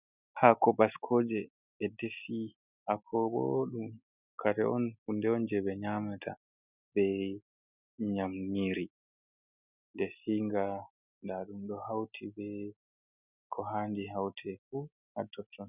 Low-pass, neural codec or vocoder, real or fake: 3.6 kHz; none; real